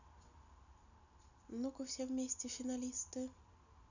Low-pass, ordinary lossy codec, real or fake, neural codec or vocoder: 7.2 kHz; none; real; none